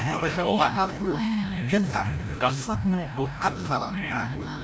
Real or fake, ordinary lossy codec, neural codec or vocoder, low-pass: fake; none; codec, 16 kHz, 0.5 kbps, FreqCodec, larger model; none